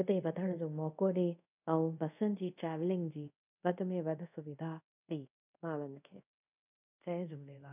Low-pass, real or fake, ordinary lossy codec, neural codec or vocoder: 3.6 kHz; fake; none; codec, 24 kHz, 0.5 kbps, DualCodec